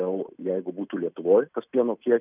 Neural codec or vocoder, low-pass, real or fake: none; 3.6 kHz; real